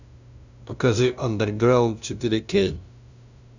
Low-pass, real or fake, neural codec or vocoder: 7.2 kHz; fake; codec, 16 kHz, 0.5 kbps, FunCodec, trained on LibriTTS, 25 frames a second